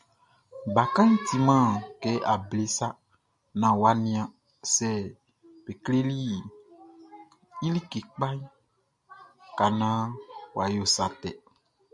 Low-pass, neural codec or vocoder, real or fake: 10.8 kHz; none; real